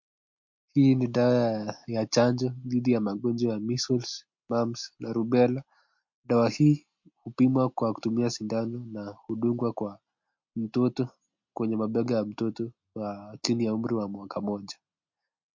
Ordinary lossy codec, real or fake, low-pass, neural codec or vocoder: MP3, 48 kbps; real; 7.2 kHz; none